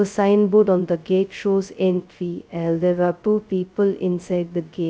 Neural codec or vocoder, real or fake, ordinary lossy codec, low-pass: codec, 16 kHz, 0.2 kbps, FocalCodec; fake; none; none